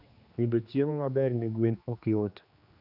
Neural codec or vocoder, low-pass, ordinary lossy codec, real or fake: codec, 16 kHz, 2 kbps, X-Codec, HuBERT features, trained on general audio; 5.4 kHz; none; fake